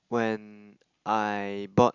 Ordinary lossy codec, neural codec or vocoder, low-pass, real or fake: Opus, 64 kbps; none; 7.2 kHz; real